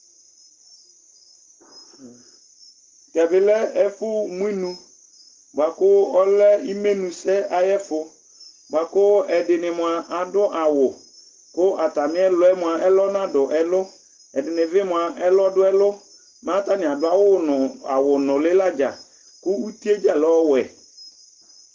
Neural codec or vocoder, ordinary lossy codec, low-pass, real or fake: none; Opus, 16 kbps; 7.2 kHz; real